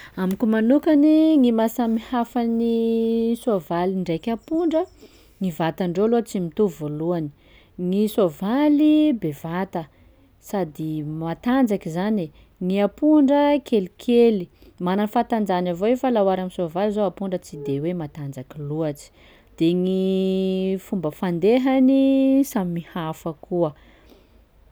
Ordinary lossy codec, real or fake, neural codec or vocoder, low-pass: none; real; none; none